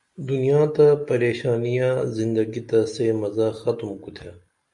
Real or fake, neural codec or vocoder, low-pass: real; none; 10.8 kHz